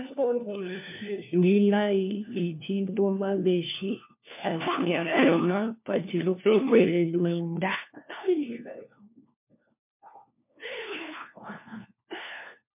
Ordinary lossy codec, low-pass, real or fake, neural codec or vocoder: MP3, 32 kbps; 3.6 kHz; fake; codec, 16 kHz, 1 kbps, FunCodec, trained on LibriTTS, 50 frames a second